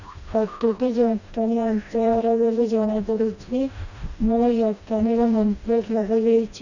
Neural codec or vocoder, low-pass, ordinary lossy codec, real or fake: codec, 16 kHz, 1 kbps, FreqCodec, smaller model; 7.2 kHz; none; fake